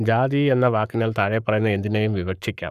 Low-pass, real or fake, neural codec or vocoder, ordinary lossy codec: 14.4 kHz; fake; codec, 44.1 kHz, 7.8 kbps, Pupu-Codec; none